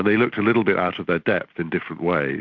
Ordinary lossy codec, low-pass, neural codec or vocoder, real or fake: MP3, 64 kbps; 7.2 kHz; none; real